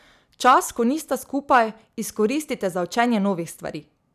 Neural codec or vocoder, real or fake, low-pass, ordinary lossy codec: none; real; 14.4 kHz; none